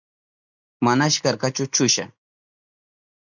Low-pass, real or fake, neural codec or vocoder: 7.2 kHz; real; none